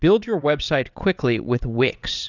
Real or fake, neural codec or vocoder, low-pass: fake; vocoder, 22.05 kHz, 80 mel bands, WaveNeXt; 7.2 kHz